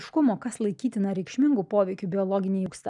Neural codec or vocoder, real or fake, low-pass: none; real; 10.8 kHz